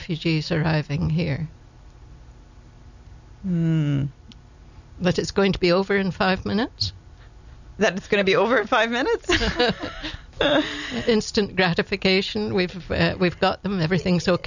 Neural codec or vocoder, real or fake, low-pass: none; real; 7.2 kHz